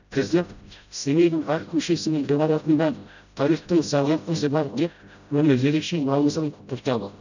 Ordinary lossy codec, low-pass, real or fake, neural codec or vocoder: none; 7.2 kHz; fake; codec, 16 kHz, 0.5 kbps, FreqCodec, smaller model